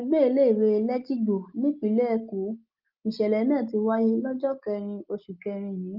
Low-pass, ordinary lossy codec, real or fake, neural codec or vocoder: 5.4 kHz; Opus, 24 kbps; real; none